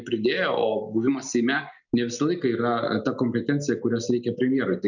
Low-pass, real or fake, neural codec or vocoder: 7.2 kHz; real; none